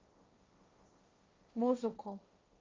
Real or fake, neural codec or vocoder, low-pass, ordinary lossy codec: fake; codec, 16 kHz, 1.1 kbps, Voila-Tokenizer; 7.2 kHz; Opus, 24 kbps